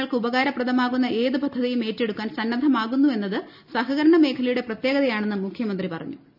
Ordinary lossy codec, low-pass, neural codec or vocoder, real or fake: none; 5.4 kHz; none; real